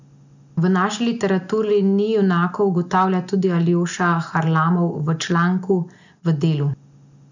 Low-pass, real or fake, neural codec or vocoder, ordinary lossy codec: 7.2 kHz; real; none; none